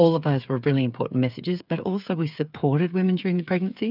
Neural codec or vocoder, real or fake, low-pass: codec, 16 kHz, 8 kbps, FreqCodec, smaller model; fake; 5.4 kHz